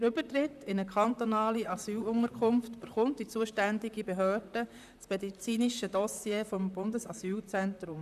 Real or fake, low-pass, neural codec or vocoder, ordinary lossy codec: fake; 14.4 kHz; vocoder, 44.1 kHz, 128 mel bands, Pupu-Vocoder; none